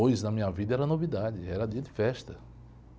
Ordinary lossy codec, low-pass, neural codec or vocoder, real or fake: none; none; none; real